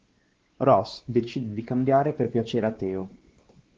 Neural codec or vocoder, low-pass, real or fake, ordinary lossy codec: codec, 16 kHz, 2 kbps, X-Codec, WavLM features, trained on Multilingual LibriSpeech; 7.2 kHz; fake; Opus, 16 kbps